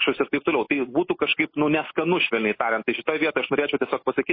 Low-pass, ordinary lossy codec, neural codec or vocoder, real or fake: 5.4 kHz; MP3, 24 kbps; none; real